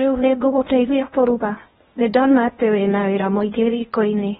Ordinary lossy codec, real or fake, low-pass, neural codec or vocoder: AAC, 16 kbps; fake; 10.8 kHz; codec, 16 kHz in and 24 kHz out, 0.8 kbps, FocalCodec, streaming, 65536 codes